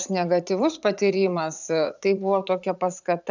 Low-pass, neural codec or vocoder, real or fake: 7.2 kHz; vocoder, 44.1 kHz, 80 mel bands, Vocos; fake